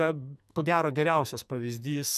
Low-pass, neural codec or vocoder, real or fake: 14.4 kHz; codec, 44.1 kHz, 2.6 kbps, SNAC; fake